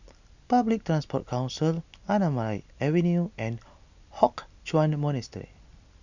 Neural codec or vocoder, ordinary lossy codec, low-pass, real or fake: none; none; 7.2 kHz; real